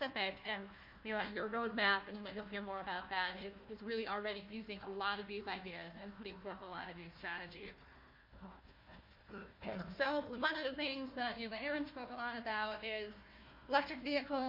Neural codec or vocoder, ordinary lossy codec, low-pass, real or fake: codec, 16 kHz, 1 kbps, FunCodec, trained on Chinese and English, 50 frames a second; MP3, 32 kbps; 5.4 kHz; fake